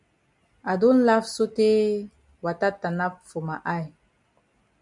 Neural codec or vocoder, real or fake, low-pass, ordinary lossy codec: none; real; 10.8 kHz; MP3, 96 kbps